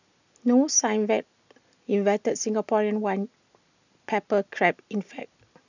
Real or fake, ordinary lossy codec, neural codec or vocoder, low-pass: real; none; none; 7.2 kHz